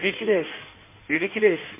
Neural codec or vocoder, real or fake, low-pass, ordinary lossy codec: codec, 16 kHz in and 24 kHz out, 1.1 kbps, FireRedTTS-2 codec; fake; 3.6 kHz; MP3, 24 kbps